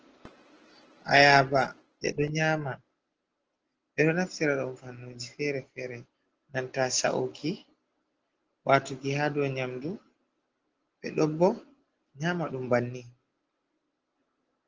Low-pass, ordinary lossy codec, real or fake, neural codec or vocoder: 7.2 kHz; Opus, 16 kbps; real; none